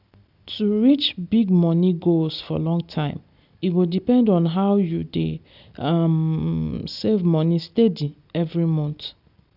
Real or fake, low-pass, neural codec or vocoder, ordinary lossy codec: real; 5.4 kHz; none; none